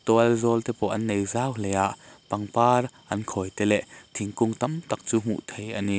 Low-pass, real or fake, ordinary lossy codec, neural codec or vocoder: none; real; none; none